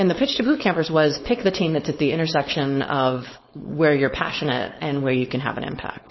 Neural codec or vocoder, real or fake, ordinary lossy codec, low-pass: codec, 16 kHz, 4.8 kbps, FACodec; fake; MP3, 24 kbps; 7.2 kHz